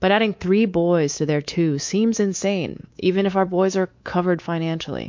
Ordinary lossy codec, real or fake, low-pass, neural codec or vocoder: MP3, 48 kbps; fake; 7.2 kHz; codec, 24 kHz, 3.1 kbps, DualCodec